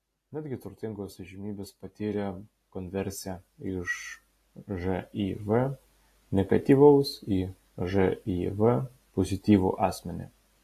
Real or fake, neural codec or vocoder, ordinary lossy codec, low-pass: real; none; AAC, 48 kbps; 14.4 kHz